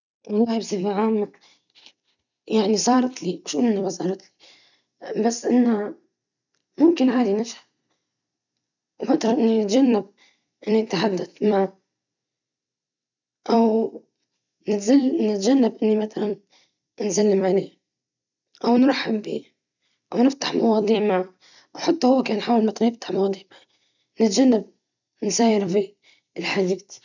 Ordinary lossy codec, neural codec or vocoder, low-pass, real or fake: none; vocoder, 44.1 kHz, 128 mel bands every 256 samples, BigVGAN v2; 7.2 kHz; fake